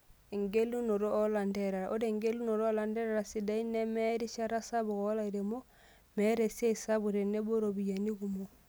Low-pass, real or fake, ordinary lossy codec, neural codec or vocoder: none; real; none; none